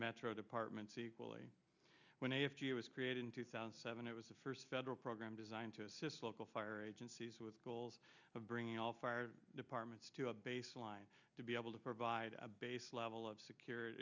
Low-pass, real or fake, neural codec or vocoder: 7.2 kHz; real; none